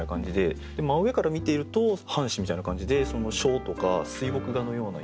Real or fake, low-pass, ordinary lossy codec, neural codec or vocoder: real; none; none; none